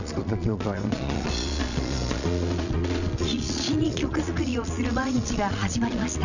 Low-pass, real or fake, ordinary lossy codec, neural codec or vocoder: 7.2 kHz; fake; none; vocoder, 22.05 kHz, 80 mel bands, Vocos